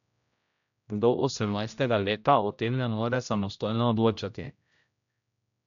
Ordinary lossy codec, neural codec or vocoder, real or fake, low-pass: none; codec, 16 kHz, 0.5 kbps, X-Codec, HuBERT features, trained on general audio; fake; 7.2 kHz